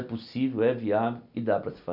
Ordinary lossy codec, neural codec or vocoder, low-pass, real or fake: none; none; 5.4 kHz; real